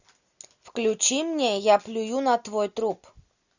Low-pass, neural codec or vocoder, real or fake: 7.2 kHz; none; real